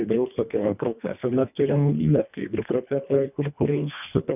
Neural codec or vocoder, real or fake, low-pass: codec, 24 kHz, 1.5 kbps, HILCodec; fake; 3.6 kHz